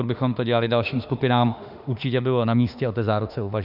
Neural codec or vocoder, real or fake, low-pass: autoencoder, 48 kHz, 32 numbers a frame, DAC-VAE, trained on Japanese speech; fake; 5.4 kHz